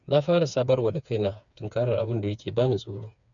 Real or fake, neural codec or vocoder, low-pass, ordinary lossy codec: fake; codec, 16 kHz, 4 kbps, FreqCodec, smaller model; 7.2 kHz; none